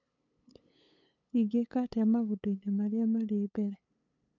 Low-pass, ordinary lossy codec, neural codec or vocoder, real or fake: 7.2 kHz; none; codec, 16 kHz, 8 kbps, FunCodec, trained on LibriTTS, 25 frames a second; fake